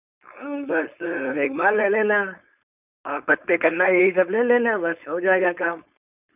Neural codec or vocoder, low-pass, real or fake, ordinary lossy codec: codec, 16 kHz, 4.8 kbps, FACodec; 3.6 kHz; fake; none